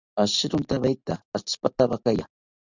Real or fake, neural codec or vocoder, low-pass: real; none; 7.2 kHz